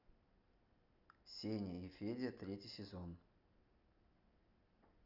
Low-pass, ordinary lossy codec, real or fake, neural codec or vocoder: 5.4 kHz; none; real; none